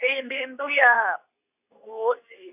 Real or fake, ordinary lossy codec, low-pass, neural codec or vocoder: fake; none; 3.6 kHz; codec, 24 kHz, 0.9 kbps, WavTokenizer, medium speech release version 2